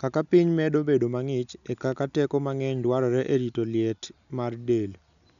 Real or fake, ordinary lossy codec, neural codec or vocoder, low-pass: real; none; none; 7.2 kHz